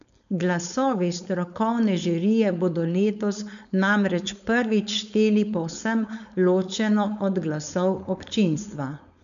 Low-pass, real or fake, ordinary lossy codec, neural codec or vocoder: 7.2 kHz; fake; none; codec, 16 kHz, 4.8 kbps, FACodec